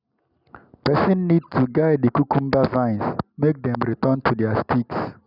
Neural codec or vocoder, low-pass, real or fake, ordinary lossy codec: none; 5.4 kHz; real; none